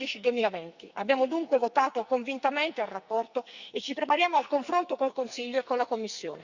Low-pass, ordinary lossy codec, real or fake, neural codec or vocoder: 7.2 kHz; Opus, 64 kbps; fake; codec, 44.1 kHz, 2.6 kbps, SNAC